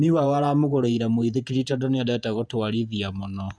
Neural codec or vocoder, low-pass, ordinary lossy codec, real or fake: vocoder, 48 kHz, 128 mel bands, Vocos; 9.9 kHz; none; fake